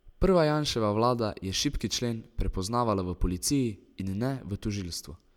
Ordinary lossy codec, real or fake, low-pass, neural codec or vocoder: none; real; 19.8 kHz; none